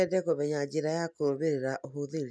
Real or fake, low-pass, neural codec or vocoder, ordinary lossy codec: real; none; none; none